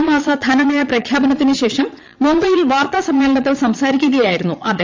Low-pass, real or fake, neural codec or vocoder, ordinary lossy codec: 7.2 kHz; fake; vocoder, 22.05 kHz, 80 mel bands, Vocos; MP3, 64 kbps